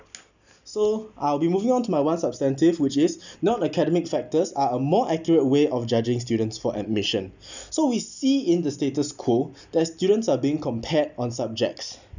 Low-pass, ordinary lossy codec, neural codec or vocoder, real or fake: 7.2 kHz; none; none; real